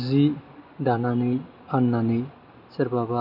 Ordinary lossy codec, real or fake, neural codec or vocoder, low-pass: MP3, 32 kbps; real; none; 5.4 kHz